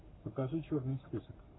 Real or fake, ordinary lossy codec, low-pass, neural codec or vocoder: fake; AAC, 16 kbps; 7.2 kHz; codec, 44.1 kHz, 7.8 kbps, Pupu-Codec